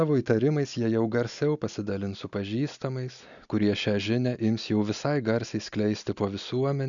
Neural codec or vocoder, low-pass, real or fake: none; 7.2 kHz; real